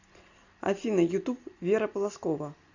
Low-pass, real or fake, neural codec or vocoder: 7.2 kHz; real; none